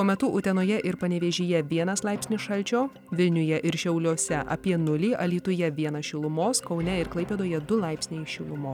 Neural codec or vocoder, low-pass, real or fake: none; 19.8 kHz; real